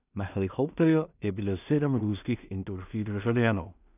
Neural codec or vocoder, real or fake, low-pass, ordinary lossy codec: codec, 16 kHz in and 24 kHz out, 0.4 kbps, LongCat-Audio-Codec, two codebook decoder; fake; 3.6 kHz; none